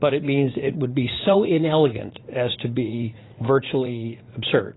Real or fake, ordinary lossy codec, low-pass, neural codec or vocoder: fake; AAC, 16 kbps; 7.2 kHz; vocoder, 44.1 kHz, 80 mel bands, Vocos